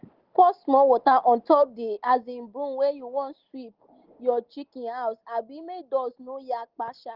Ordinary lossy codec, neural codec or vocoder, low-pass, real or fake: Opus, 16 kbps; none; 5.4 kHz; real